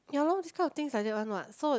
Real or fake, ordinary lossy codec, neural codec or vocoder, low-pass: real; none; none; none